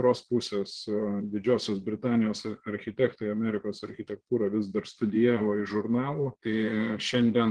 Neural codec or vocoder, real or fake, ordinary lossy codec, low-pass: vocoder, 22.05 kHz, 80 mel bands, Vocos; fake; Opus, 16 kbps; 9.9 kHz